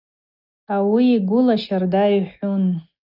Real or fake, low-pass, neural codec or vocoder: real; 5.4 kHz; none